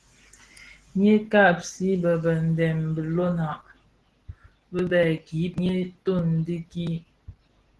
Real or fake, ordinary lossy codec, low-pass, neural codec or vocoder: real; Opus, 16 kbps; 10.8 kHz; none